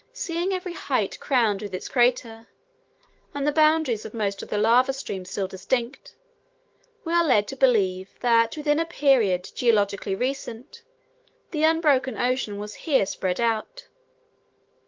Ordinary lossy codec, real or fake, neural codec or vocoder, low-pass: Opus, 16 kbps; fake; autoencoder, 48 kHz, 128 numbers a frame, DAC-VAE, trained on Japanese speech; 7.2 kHz